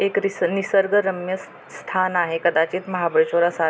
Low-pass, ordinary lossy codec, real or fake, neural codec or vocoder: none; none; real; none